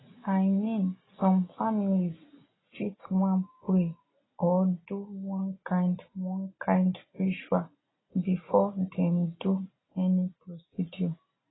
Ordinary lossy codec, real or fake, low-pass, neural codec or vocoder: AAC, 16 kbps; real; 7.2 kHz; none